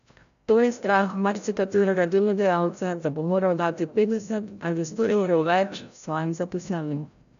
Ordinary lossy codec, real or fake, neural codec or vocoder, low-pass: none; fake; codec, 16 kHz, 0.5 kbps, FreqCodec, larger model; 7.2 kHz